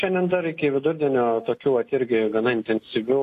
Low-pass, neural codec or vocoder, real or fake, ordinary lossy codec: 14.4 kHz; none; real; AAC, 48 kbps